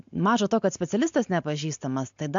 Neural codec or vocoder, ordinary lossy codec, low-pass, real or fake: none; MP3, 64 kbps; 7.2 kHz; real